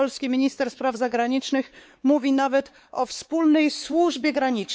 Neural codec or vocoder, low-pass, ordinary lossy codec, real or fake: codec, 16 kHz, 4 kbps, X-Codec, WavLM features, trained on Multilingual LibriSpeech; none; none; fake